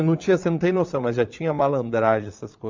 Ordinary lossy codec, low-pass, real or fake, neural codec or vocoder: MP3, 64 kbps; 7.2 kHz; fake; vocoder, 22.05 kHz, 80 mel bands, Vocos